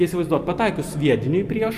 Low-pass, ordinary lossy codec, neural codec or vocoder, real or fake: 14.4 kHz; Opus, 64 kbps; none; real